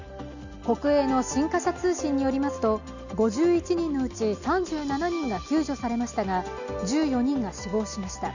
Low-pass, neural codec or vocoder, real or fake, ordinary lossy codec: 7.2 kHz; none; real; none